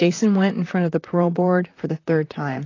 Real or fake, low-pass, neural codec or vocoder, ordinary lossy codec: fake; 7.2 kHz; vocoder, 44.1 kHz, 128 mel bands, Pupu-Vocoder; AAC, 32 kbps